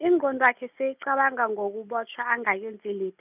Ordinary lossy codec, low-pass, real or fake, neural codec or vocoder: none; 3.6 kHz; real; none